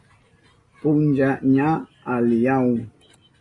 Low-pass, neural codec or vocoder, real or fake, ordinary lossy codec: 10.8 kHz; none; real; AAC, 32 kbps